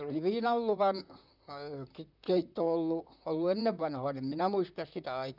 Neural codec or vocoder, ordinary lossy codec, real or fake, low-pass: vocoder, 44.1 kHz, 128 mel bands, Pupu-Vocoder; none; fake; 5.4 kHz